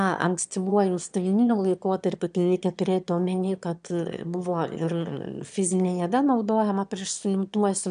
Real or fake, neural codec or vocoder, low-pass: fake; autoencoder, 22.05 kHz, a latent of 192 numbers a frame, VITS, trained on one speaker; 9.9 kHz